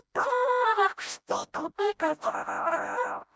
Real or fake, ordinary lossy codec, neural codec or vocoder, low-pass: fake; none; codec, 16 kHz, 0.5 kbps, FreqCodec, smaller model; none